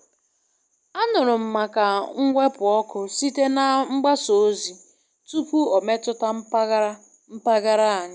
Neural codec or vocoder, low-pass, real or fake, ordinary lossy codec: none; none; real; none